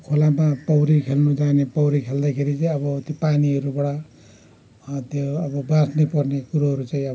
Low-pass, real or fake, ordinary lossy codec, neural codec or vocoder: none; real; none; none